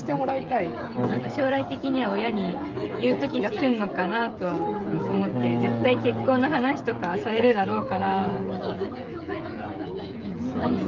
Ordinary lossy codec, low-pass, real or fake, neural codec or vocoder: Opus, 24 kbps; 7.2 kHz; fake; codec, 16 kHz, 16 kbps, FreqCodec, smaller model